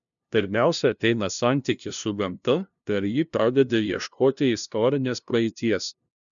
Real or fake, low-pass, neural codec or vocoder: fake; 7.2 kHz; codec, 16 kHz, 0.5 kbps, FunCodec, trained on LibriTTS, 25 frames a second